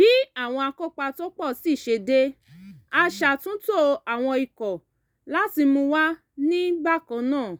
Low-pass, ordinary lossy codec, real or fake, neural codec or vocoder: none; none; real; none